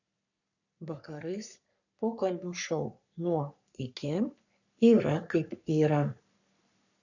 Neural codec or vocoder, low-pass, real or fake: codec, 44.1 kHz, 3.4 kbps, Pupu-Codec; 7.2 kHz; fake